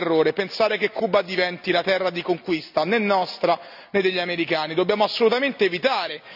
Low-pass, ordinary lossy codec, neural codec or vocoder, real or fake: 5.4 kHz; none; none; real